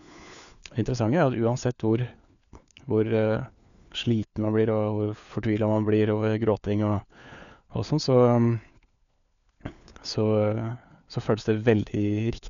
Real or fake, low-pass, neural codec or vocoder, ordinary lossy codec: fake; 7.2 kHz; codec, 16 kHz, 4 kbps, FunCodec, trained on LibriTTS, 50 frames a second; none